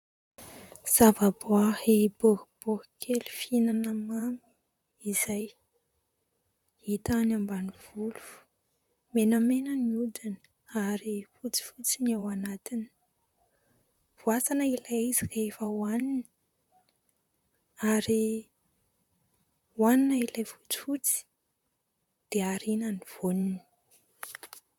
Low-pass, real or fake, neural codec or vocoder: 19.8 kHz; fake; vocoder, 44.1 kHz, 128 mel bands, Pupu-Vocoder